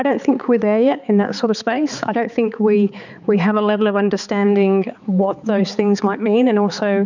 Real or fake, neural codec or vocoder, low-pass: fake; codec, 16 kHz, 4 kbps, X-Codec, HuBERT features, trained on balanced general audio; 7.2 kHz